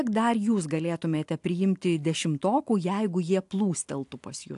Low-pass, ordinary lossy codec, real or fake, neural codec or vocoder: 10.8 kHz; AAC, 64 kbps; real; none